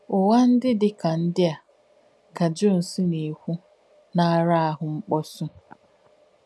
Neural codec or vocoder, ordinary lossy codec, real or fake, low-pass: none; none; real; none